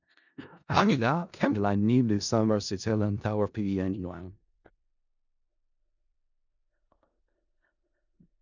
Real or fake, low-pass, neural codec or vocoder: fake; 7.2 kHz; codec, 16 kHz in and 24 kHz out, 0.4 kbps, LongCat-Audio-Codec, four codebook decoder